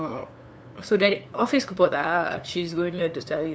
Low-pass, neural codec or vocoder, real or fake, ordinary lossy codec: none; codec, 16 kHz, 2 kbps, FunCodec, trained on LibriTTS, 25 frames a second; fake; none